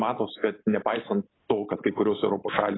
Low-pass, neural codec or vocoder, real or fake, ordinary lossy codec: 7.2 kHz; none; real; AAC, 16 kbps